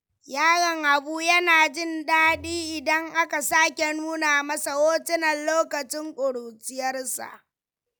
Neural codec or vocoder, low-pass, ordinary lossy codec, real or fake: none; none; none; real